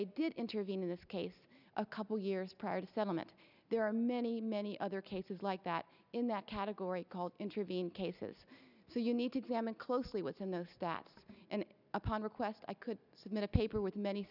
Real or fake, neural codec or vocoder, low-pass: real; none; 5.4 kHz